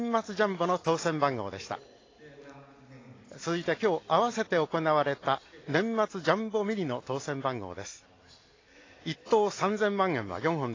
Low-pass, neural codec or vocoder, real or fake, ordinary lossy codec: 7.2 kHz; none; real; AAC, 32 kbps